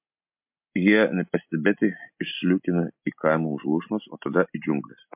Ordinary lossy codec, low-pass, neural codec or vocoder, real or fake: MP3, 32 kbps; 3.6 kHz; none; real